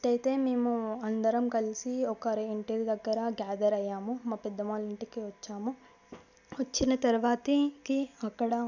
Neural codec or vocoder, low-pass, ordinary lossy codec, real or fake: none; 7.2 kHz; none; real